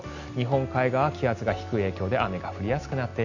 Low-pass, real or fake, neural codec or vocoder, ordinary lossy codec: 7.2 kHz; real; none; none